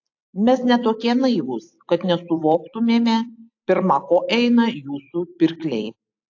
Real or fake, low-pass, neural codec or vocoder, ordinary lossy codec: fake; 7.2 kHz; vocoder, 44.1 kHz, 128 mel bands every 256 samples, BigVGAN v2; AAC, 48 kbps